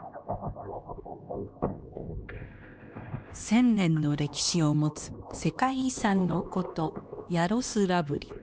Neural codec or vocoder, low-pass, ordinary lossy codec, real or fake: codec, 16 kHz, 2 kbps, X-Codec, HuBERT features, trained on LibriSpeech; none; none; fake